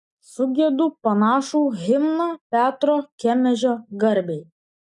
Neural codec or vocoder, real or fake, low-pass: none; real; 10.8 kHz